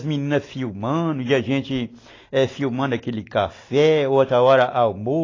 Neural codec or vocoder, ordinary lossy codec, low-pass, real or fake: none; AAC, 32 kbps; 7.2 kHz; real